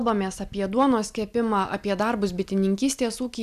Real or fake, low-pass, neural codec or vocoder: real; 14.4 kHz; none